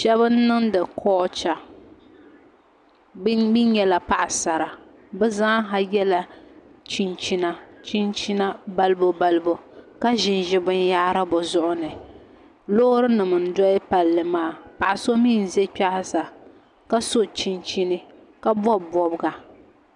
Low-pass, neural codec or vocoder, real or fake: 10.8 kHz; none; real